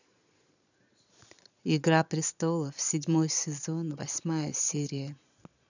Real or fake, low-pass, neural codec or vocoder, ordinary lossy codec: fake; 7.2 kHz; vocoder, 22.05 kHz, 80 mel bands, Vocos; none